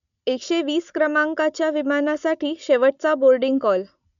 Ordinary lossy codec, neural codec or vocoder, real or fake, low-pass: MP3, 96 kbps; none; real; 7.2 kHz